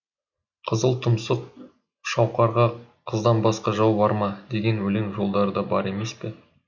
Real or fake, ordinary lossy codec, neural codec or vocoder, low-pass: real; none; none; 7.2 kHz